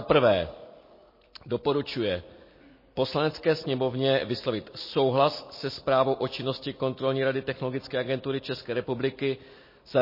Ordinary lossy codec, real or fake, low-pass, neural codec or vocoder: MP3, 24 kbps; real; 5.4 kHz; none